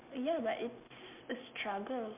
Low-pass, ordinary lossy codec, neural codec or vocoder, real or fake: 3.6 kHz; MP3, 32 kbps; none; real